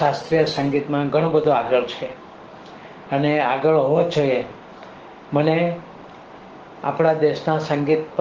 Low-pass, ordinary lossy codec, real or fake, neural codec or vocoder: 7.2 kHz; Opus, 16 kbps; fake; codec, 16 kHz, 6 kbps, DAC